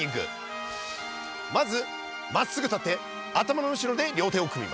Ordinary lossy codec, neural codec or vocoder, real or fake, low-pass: none; none; real; none